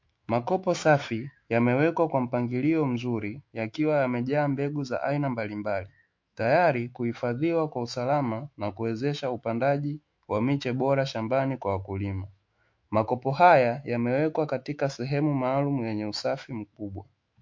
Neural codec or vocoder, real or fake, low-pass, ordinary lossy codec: autoencoder, 48 kHz, 128 numbers a frame, DAC-VAE, trained on Japanese speech; fake; 7.2 kHz; MP3, 48 kbps